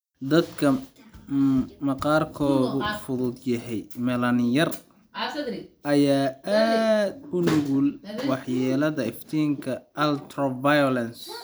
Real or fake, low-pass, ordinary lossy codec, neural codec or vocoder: fake; none; none; vocoder, 44.1 kHz, 128 mel bands every 256 samples, BigVGAN v2